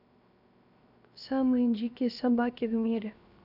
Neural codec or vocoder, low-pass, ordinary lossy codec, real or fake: codec, 16 kHz, 0.7 kbps, FocalCodec; 5.4 kHz; none; fake